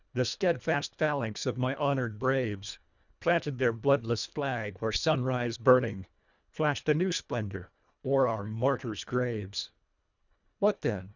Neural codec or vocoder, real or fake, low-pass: codec, 24 kHz, 1.5 kbps, HILCodec; fake; 7.2 kHz